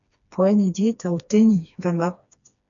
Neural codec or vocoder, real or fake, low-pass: codec, 16 kHz, 2 kbps, FreqCodec, smaller model; fake; 7.2 kHz